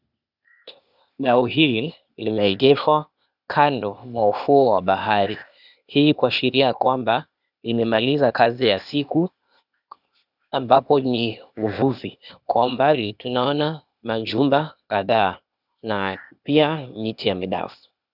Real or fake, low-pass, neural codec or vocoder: fake; 5.4 kHz; codec, 16 kHz, 0.8 kbps, ZipCodec